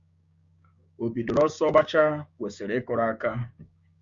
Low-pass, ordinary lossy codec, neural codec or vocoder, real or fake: 7.2 kHz; Opus, 64 kbps; codec, 16 kHz, 6 kbps, DAC; fake